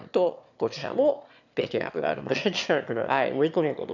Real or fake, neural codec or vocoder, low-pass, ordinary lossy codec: fake; autoencoder, 22.05 kHz, a latent of 192 numbers a frame, VITS, trained on one speaker; 7.2 kHz; none